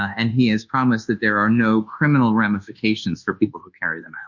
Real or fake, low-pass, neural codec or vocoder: fake; 7.2 kHz; codec, 24 kHz, 1.2 kbps, DualCodec